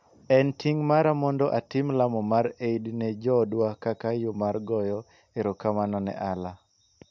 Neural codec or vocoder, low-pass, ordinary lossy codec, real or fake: none; 7.2 kHz; MP3, 64 kbps; real